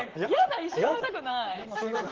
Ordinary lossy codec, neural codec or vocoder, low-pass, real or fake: Opus, 16 kbps; vocoder, 44.1 kHz, 128 mel bands, Pupu-Vocoder; 7.2 kHz; fake